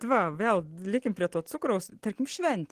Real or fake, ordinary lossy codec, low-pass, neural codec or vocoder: real; Opus, 16 kbps; 14.4 kHz; none